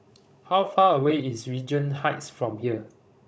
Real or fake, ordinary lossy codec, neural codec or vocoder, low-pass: fake; none; codec, 16 kHz, 16 kbps, FunCodec, trained on Chinese and English, 50 frames a second; none